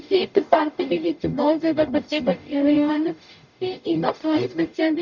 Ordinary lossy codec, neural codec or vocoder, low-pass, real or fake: none; codec, 44.1 kHz, 0.9 kbps, DAC; 7.2 kHz; fake